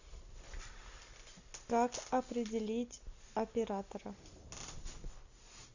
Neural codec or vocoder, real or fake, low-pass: none; real; 7.2 kHz